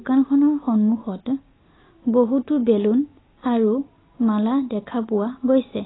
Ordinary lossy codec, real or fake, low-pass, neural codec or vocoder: AAC, 16 kbps; real; 7.2 kHz; none